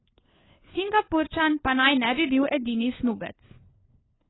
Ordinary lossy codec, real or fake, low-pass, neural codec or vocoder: AAC, 16 kbps; fake; 7.2 kHz; codec, 16 kHz, 4 kbps, FunCodec, trained on LibriTTS, 50 frames a second